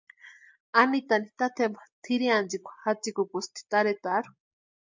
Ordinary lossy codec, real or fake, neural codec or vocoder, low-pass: MP3, 64 kbps; real; none; 7.2 kHz